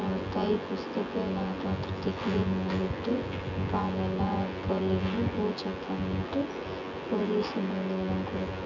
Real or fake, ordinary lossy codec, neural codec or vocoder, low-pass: fake; none; vocoder, 24 kHz, 100 mel bands, Vocos; 7.2 kHz